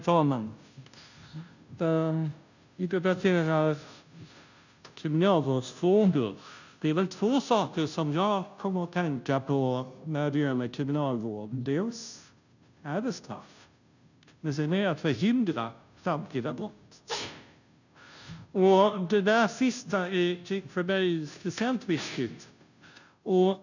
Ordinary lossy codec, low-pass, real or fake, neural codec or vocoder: none; 7.2 kHz; fake; codec, 16 kHz, 0.5 kbps, FunCodec, trained on Chinese and English, 25 frames a second